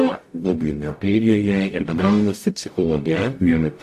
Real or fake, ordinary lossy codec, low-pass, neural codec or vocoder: fake; AAC, 64 kbps; 14.4 kHz; codec, 44.1 kHz, 0.9 kbps, DAC